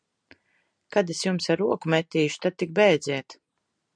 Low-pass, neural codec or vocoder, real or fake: 9.9 kHz; none; real